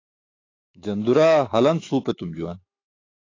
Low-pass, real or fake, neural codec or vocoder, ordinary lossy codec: 7.2 kHz; fake; autoencoder, 48 kHz, 128 numbers a frame, DAC-VAE, trained on Japanese speech; AAC, 32 kbps